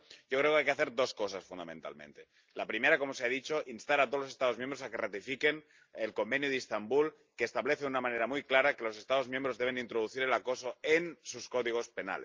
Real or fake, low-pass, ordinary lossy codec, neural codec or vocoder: real; 7.2 kHz; Opus, 24 kbps; none